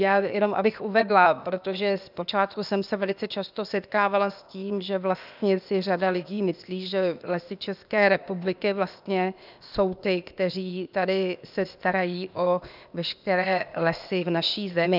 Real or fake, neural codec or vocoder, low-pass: fake; codec, 16 kHz, 0.8 kbps, ZipCodec; 5.4 kHz